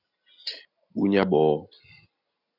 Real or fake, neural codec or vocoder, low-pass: real; none; 5.4 kHz